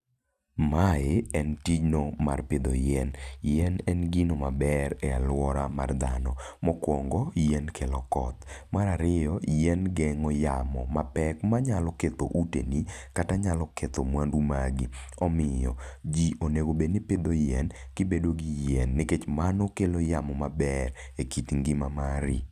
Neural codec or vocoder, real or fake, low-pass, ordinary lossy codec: none; real; 14.4 kHz; none